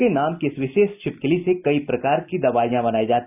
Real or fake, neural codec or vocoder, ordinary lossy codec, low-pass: real; none; none; 3.6 kHz